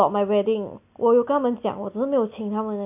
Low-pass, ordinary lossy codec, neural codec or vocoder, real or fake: 3.6 kHz; AAC, 32 kbps; none; real